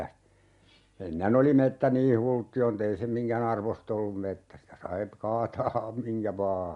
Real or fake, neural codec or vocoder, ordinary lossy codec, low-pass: real; none; MP3, 64 kbps; 10.8 kHz